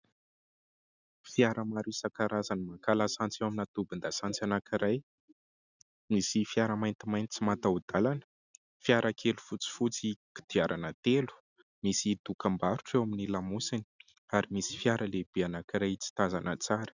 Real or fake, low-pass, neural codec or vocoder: real; 7.2 kHz; none